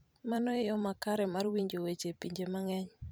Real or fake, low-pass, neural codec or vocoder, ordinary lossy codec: fake; none; vocoder, 44.1 kHz, 128 mel bands every 512 samples, BigVGAN v2; none